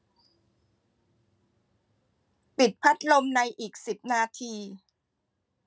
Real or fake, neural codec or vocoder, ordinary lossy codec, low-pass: real; none; none; none